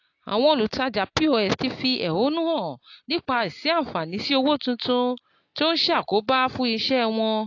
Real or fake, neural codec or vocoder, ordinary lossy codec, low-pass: real; none; AAC, 48 kbps; 7.2 kHz